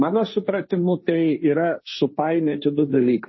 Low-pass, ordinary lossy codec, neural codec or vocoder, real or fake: 7.2 kHz; MP3, 24 kbps; codec, 16 kHz, 1.1 kbps, Voila-Tokenizer; fake